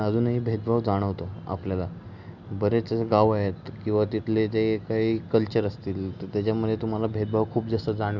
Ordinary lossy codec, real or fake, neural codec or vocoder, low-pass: none; real; none; 7.2 kHz